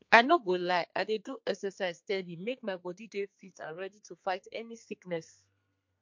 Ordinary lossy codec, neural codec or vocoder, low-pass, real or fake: MP3, 48 kbps; codec, 32 kHz, 1.9 kbps, SNAC; 7.2 kHz; fake